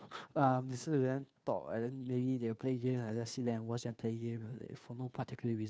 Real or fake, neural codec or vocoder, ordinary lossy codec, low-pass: fake; codec, 16 kHz, 2 kbps, FunCodec, trained on Chinese and English, 25 frames a second; none; none